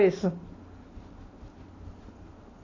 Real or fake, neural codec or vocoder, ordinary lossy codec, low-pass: real; none; AAC, 32 kbps; 7.2 kHz